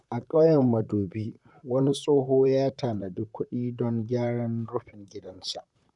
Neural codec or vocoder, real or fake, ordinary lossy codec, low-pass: vocoder, 44.1 kHz, 128 mel bands, Pupu-Vocoder; fake; none; 10.8 kHz